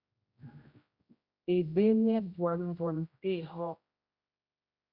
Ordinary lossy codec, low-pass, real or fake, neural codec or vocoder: Opus, 64 kbps; 5.4 kHz; fake; codec, 16 kHz, 0.5 kbps, X-Codec, HuBERT features, trained on general audio